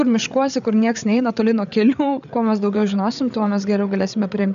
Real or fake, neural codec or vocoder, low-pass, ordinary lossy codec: fake; codec, 16 kHz, 8 kbps, FreqCodec, larger model; 7.2 kHz; MP3, 96 kbps